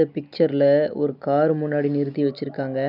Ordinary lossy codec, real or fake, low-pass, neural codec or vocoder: none; real; 5.4 kHz; none